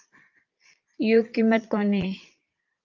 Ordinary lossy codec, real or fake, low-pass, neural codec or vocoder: Opus, 24 kbps; fake; 7.2 kHz; vocoder, 22.05 kHz, 80 mel bands, Vocos